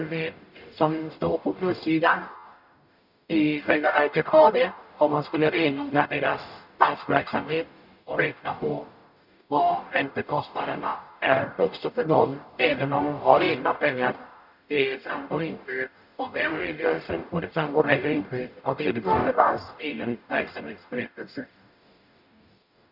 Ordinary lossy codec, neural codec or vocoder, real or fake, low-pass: none; codec, 44.1 kHz, 0.9 kbps, DAC; fake; 5.4 kHz